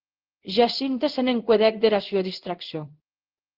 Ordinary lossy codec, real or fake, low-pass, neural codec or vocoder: Opus, 16 kbps; fake; 5.4 kHz; codec, 16 kHz in and 24 kHz out, 1 kbps, XY-Tokenizer